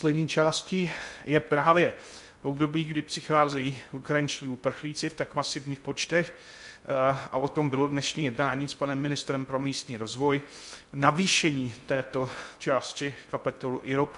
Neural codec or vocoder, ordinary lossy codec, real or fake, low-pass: codec, 16 kHz in and 24 kHz out, 0.6 kbps, FocalCodec, streaming, 2048 codes; MP3, 64 kbps; fake; 10.8 kHz